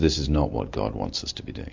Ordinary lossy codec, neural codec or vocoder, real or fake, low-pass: MP3, 48 kbps; none; real; 7.2 kHz